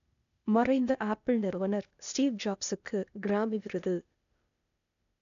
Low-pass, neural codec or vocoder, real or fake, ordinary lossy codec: 7.2 kHz; codec, 16 kHz, 0.8 kbps, ZipCodec; fake; none